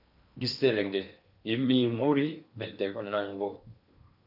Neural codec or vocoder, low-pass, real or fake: codec, 16 kHz in and 24 kHz out, 0.8 kbps, FocalCodec, streaming, 65536 codes; 5.4 kHz; fake